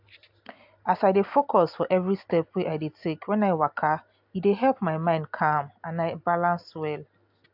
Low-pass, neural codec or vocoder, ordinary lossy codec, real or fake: 5.4 kHz; none; AAC, 48 kbps; real